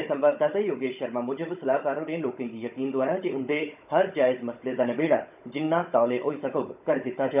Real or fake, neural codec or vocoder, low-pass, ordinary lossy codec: fake; codec, 16 kHz, 16 kbps, FunCodec, trained on Chinese and English, 50 frames a second; 3.6 kHz; none